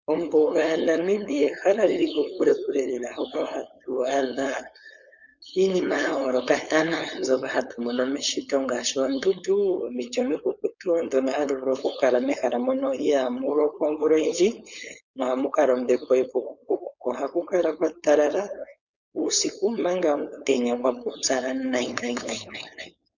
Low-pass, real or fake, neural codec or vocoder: 7.2 kHz; fake; codec, 16 kHz, 4.8 kbps, FACodec